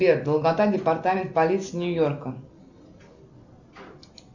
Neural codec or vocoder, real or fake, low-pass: none; real; 7.2 kHz